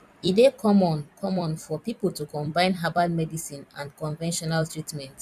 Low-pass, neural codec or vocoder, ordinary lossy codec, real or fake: 14.4 kHz; vocoder, 44.1 kHz, 128 mel bands every 256 samples, BigVGAN v2; none; fake